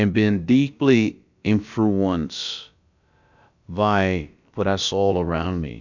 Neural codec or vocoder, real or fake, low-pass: codec, 16 kHz, about 1 kbps, DyCAST, with the encoder's durations; fake; 7.2 kHz